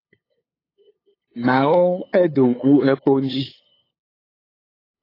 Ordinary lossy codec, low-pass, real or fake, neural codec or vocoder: AAC, 24 kbps; 5.4 kHz; fake; codec, 16 kHz, 8 kbps, FunCodec, trained on LibriTTS, 25 frames a second